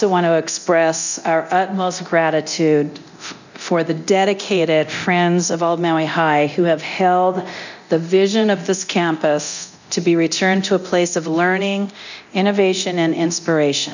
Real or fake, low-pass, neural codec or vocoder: fake; 7.2 kHz; codec, 24 kHz, 0.9 kbps, DualCodec